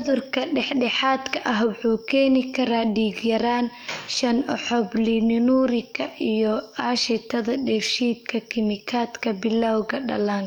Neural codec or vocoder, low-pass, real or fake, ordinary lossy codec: codec, 44.1 kHz, 7.8 kbps, DAC; 19.8 kHz; fake; none